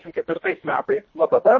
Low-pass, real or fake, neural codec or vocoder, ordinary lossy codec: 7.2 kHz; fake; codec, 24 kHz, 1.5 kbps, HILCodec; MP3, 32 kbps